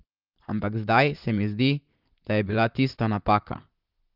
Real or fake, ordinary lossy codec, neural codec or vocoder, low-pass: fake; Opus, 32 kbps; vocoder, 44.1 kHz, 128 mel bands, Pupu-Vocoder; 5.4 kHz